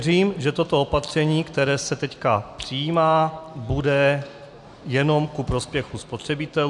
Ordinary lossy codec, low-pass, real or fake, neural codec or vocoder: MP3, 96 kbps; 10.8 kHz; real; none